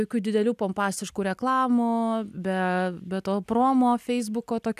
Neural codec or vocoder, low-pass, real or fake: none; 14.4 kHz; real